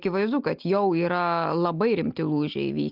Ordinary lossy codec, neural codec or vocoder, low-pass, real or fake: Opus, 24 kbps; none; 5.4 kHz; real